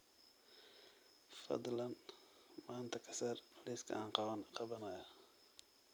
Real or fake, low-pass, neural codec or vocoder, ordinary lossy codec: real; none; none; none